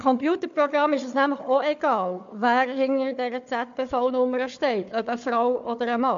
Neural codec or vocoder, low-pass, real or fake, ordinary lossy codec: codec, 16 kHz, 4 kbps, FunCodec, trained on Chinese and English, 50 frames a second; 7.2 kHz; fake; MP3, 64 kbps